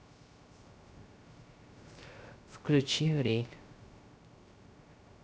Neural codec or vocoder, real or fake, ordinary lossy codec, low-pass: codec, 16 kHz, 0.3 kbps, FocalCodec; fake; none; none